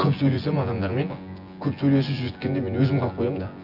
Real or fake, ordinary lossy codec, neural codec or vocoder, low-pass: fake; none; vocoder, 24 kHz, 100 mel bands, Vocos; 5.4 kHz